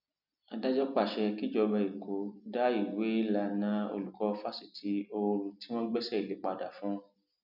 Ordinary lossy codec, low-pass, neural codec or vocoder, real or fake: none; 5.4 kHz; none; real